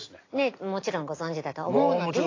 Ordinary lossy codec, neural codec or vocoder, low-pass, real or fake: AAC, 32 kbps; none; 7.2 kHz; real